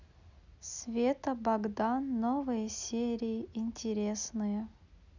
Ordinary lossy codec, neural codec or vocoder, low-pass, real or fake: none; none; 7.2 kHz; real